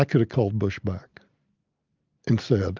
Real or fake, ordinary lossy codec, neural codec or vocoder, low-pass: real; Opus, 24 kbps; none; 7.2 kHz